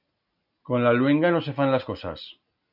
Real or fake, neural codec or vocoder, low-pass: real; none; 5.4 kHz